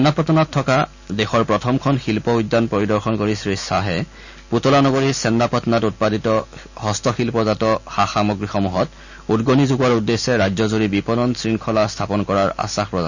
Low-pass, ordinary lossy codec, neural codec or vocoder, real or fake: 7.2 kHz; none; none; real